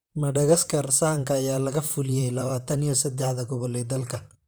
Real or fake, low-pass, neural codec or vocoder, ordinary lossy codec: fake; none; vocoder, 44.1 kHz, 128 mel bands, Pupu-Vocoder; none